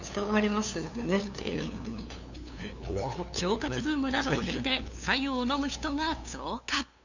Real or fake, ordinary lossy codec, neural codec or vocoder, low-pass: fake; none; codec, 16 kHz, 2 kbps, FunCodec, trained on LibriTTS, 25 frames a second; 7.2 kHz